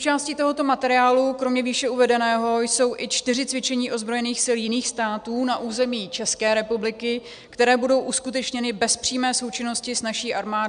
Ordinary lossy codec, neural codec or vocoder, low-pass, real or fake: AAC, 96 kbps; none; 9.9 kHz; real